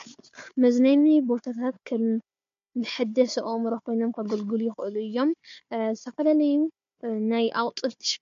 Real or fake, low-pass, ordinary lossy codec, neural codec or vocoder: fake; 7.2 kHz; MP3, 48 kbps; codec, 16 kHz, 4 kbps, FunCodec, trained on Chinese and English, 50 frames a second